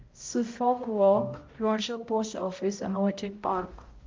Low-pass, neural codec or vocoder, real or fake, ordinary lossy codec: 7.2 kHz; codec, 16 kHz, 0.5 kbps, X-Codec, HuBERT features, trained on balanced general audio; fake; Opus, 24 kbps